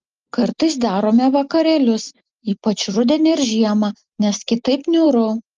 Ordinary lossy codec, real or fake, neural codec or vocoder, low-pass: Opus, 24 kbps; real; none; 7.2 kHz